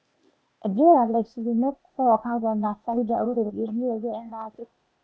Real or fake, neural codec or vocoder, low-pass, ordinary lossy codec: fake; codec, 16 kHz, 0.8 kbps, ZipCodec; none; none